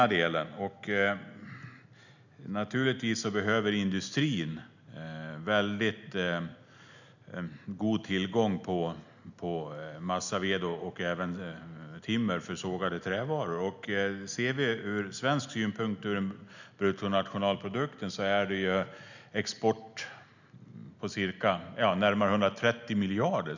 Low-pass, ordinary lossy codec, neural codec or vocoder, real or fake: 7.2 kHz; none; none; real